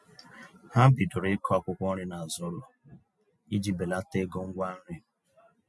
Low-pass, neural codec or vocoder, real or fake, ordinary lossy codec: none; none; real; none